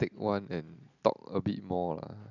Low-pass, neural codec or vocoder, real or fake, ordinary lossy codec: 7.2 kHz; none; real; none